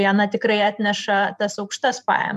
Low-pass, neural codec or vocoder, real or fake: 14.4 kHz; vocoder, 44.1 kHz, 128 mel bands every 512 samples, BigVGAN v2; fake